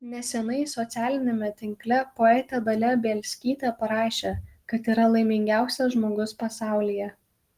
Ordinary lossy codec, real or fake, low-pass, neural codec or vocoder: Opus, 24 kbps; real; 14.4 kHz; none